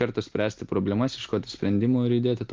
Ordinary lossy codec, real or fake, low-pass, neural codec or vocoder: Opus, 24 kbps; real; 7.2 kHz; none